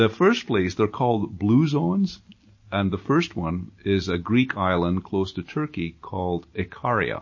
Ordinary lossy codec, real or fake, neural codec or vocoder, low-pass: MP3, 32 kbps; real; none; 7.2 kHz